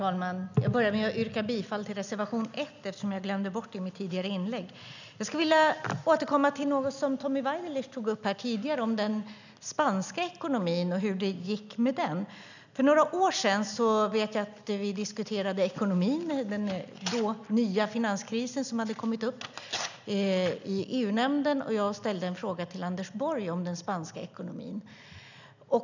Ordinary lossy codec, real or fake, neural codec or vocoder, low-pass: none; real; none; 7.2 kHz